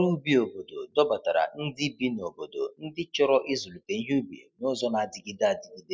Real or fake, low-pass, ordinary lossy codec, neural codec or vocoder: real; none; none; none